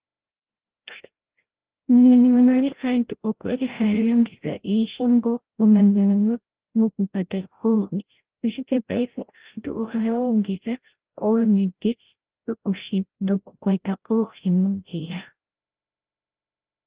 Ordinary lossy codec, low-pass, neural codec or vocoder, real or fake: Opus, 16 kbps; 3.6 kHz; codec, 16 kHz, 0.5 kbps, FreqCodec, larger model; fake